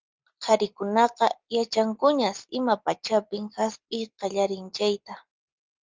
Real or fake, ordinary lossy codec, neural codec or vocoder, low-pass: real; Opus, 32 kbps; none; 7.2 kHz